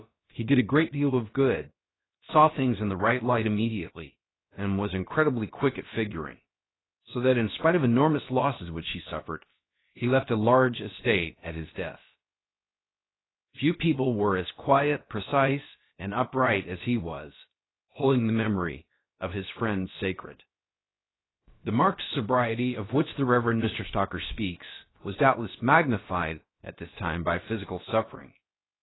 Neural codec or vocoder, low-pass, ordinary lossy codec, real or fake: codec, 16 kHz, about 1 kbps, DyCAST, with the encoder's durations; 7.2 kHz; AAC, 16 kbps; fake